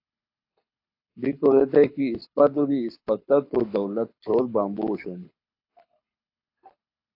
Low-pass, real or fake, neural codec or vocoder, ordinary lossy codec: 5.4 kHz; fake; codec, 24 kHz, 6 kbps, HILCodec; AAC, 32 kbps